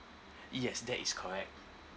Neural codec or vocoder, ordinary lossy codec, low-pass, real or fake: none; none; none; real